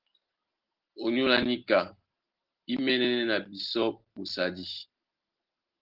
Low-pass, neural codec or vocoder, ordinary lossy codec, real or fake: 5.4 kHz; none; Opus, 16 kbps; real